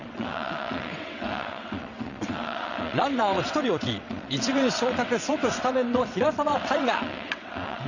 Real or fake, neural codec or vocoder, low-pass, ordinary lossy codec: fake; vocoder, 22.05 kHz, 80 mel bands, WaveNeXt; 7.2 kHz; none